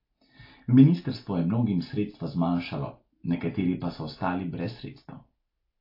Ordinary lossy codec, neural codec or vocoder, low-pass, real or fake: AAC, 32 kbps; none; 5.4 kHz; real